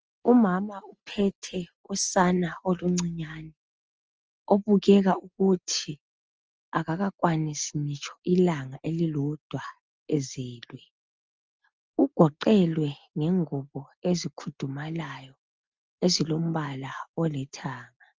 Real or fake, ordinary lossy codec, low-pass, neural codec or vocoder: real; Opus, 24 kbps; 7.2 kHz; none